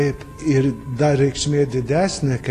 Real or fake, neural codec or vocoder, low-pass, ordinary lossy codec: real; none; 14.4 kHz; AAC, 48 kbps